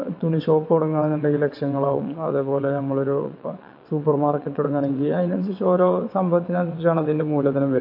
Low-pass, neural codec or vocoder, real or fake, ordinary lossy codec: 5.4 kHz; vocoder, 22.05 kHz, 80 mel bands, WaveNeXt; fake; MP3, 32 kbps